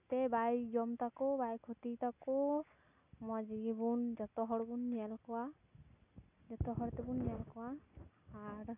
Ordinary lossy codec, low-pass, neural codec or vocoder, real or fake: none; 3.6 kHz; none; real